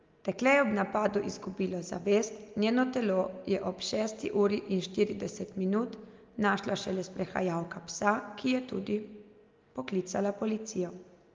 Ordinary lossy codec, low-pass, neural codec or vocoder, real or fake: Opus, 32 kbps; 7.2 kHz; none; real